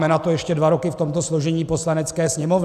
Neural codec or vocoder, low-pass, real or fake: none; 14.4 kHz; real